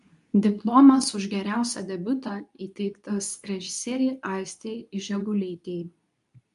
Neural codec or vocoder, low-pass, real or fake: codec, 24 kHz, 0.9 kbps, WavTokenizer, medium speech release version 2; 10.8 kHz; fake